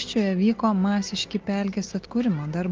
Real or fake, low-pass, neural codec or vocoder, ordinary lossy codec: real; 7.2 kHz; none; Opus, 32 kbps